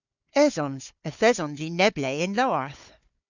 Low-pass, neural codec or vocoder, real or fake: 7.2 kHz; codec, 16 kHz, 4 kbps, FunCodec, trained on Chinese and English, 50 frames a second; fake